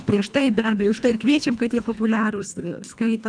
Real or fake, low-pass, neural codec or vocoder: fake; 9.9 kHz; codec, 24 kHz, 1.5 kbps, HILCodec